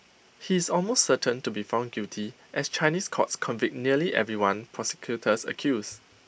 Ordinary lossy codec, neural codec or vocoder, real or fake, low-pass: none; none; real; none